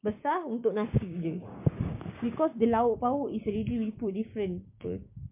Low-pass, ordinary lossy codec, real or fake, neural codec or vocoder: 3.6 kHz; MP3, 32 kbps; fake; codec, 16 kHz, 6 kbps, DAC